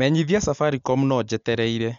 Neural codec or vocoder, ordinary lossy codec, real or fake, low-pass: none; MP3, 64 kbps; real; 7.2 kHz